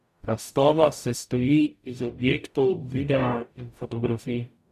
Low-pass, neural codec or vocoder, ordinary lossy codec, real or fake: 14.4 kHz; codec, 44.1 kHz, 0.9 kbps, DAC; none; fake